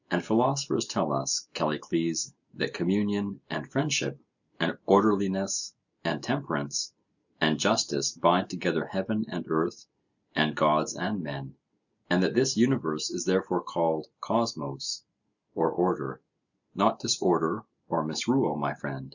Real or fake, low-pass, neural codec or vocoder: real; 7.2 kHz; none